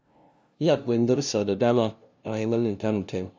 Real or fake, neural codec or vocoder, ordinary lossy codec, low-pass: fake; codec, 16 kHz, 0.5 kbps, FunCodec, trained on LibriTTS, 25 frames a second; none; none